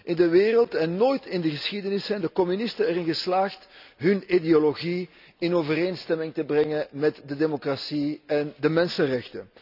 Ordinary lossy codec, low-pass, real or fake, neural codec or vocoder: none; 5.4 kHz; real; none